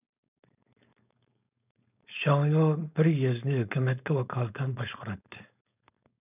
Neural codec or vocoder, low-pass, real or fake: codec, 16 kHz, 4.8 kbps, FACodec; 3.6 kHz; fake